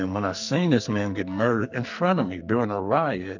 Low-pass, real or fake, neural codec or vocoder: 7.2 kHz; fake; codec, 44.1 kHz, 2.6 kbps, SNAC